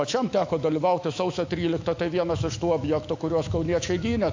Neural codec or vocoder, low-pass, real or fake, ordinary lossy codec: autoencoder, 48 kHz, 128 numbers a frame, DAC-VAE, trained on Japanese speech; 7.2 kHz; fake; AAC, 48 kbps